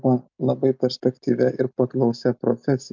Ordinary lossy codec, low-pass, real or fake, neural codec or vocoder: MP3, 64 kbps; 7.2 kHz; real; none